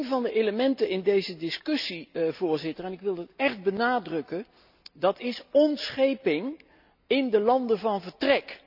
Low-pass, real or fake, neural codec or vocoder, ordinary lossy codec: 5.4 kHz; real; none; none